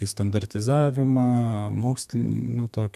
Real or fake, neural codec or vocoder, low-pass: fake; codec, 32 kHz, 1.9 kbps, SNAC; 14.4 kHz